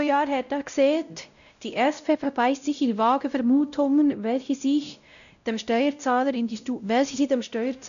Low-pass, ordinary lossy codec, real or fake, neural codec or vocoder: 7.2 kHz; none; fake; codec, 16 kHz, 0.5 kbps, X-Codec, WavLM features, trained on Multilingual LibriSpeech